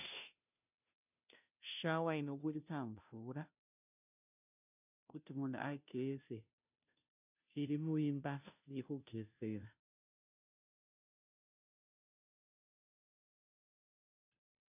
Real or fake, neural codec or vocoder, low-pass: fake; codec, 16 kHz, 0.5 kbps, FunCodec, trained on Chinese and English, 25 frames a second; 3.6 kHz